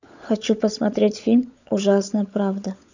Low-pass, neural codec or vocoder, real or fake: 7.2 kHz; codec, 16 kHz, 16 kbps, FunCodec, trained on LibriTTS, 50 frames a second; fake